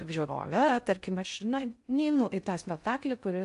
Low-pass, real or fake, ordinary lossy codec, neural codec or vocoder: 10.8 kHz; fake; AAC, 64 kbps; codec, 16 kHz in and 24 kHz out, 0.6 kbps, FocalCodec, streaming, 4096 codes